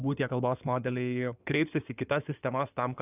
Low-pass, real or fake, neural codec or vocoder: 3.6 kHz; fake; codec, 16 kHz in and 24 kHz out, 2.2 kbps, FireRedTTS-2 codec